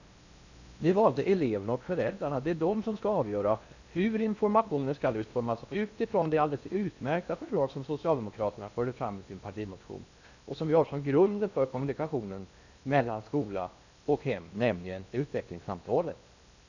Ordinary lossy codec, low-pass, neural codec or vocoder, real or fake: none; 7.2 kHz; codec, 16 kHz in and 24 kHz out, 0.8 kbps, FocalCodec, streaming, 65536 codes; fake